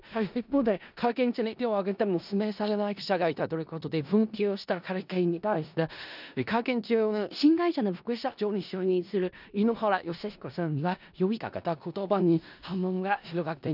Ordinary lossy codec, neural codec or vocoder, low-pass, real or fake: none; codec, 16 kHz in and 24 kHz out, 0.4 kbps, LongCat-Audio-Codec, four codebook decoder; 5.4 kHz; fake